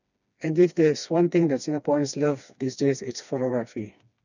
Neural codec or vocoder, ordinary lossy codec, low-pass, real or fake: codec, 16 kHz, 2 kbps, FreqCodec, smaller model; none; 7.2 kHz; fake